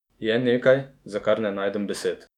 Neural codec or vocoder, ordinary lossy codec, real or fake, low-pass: autoencoder, 48 kHz, 128 numbers a frame, DAC-VAE, trained on Japanese speech; none; fake; 19.8 kHz